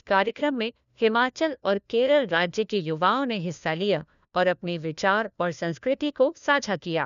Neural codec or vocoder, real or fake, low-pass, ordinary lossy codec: codec, 16 kHz, 1 kbps, FunCodec, trained on LibriTTS, 50 frames a second; fake; 7.2 kHz; none